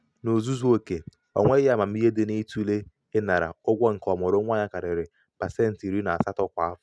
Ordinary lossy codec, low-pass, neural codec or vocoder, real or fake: none; none; none; real